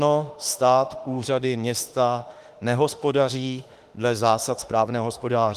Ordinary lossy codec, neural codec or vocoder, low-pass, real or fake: Opus, 24 kbps; autoencoder, 48 kHz, 32 numbers a frame, DAC-VAE, trained on Japanese speech; 14.4 kHz; fake